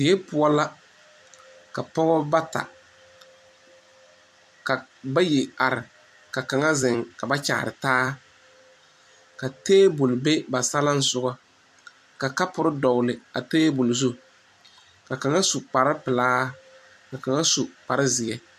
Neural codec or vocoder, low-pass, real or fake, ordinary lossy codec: vocoder, 44.1 kHz, 128 mel bands every 256 samples, BigVGAN v2; 14.4 kHz; fake; MP3, 96 kbps